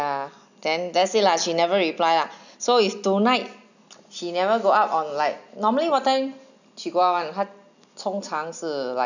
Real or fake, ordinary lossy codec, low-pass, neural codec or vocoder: real; none; 7.2 kHz; none